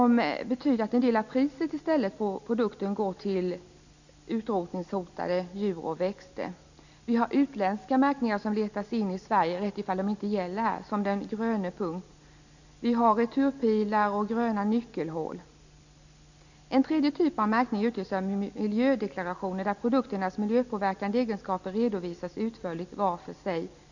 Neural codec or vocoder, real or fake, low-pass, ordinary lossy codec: none; real; 7.2 kHz; none